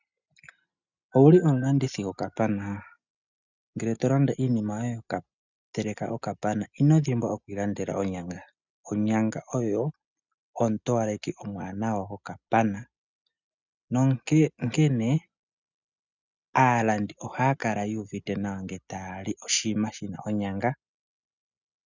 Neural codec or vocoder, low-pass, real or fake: none; 7.2 kHz; real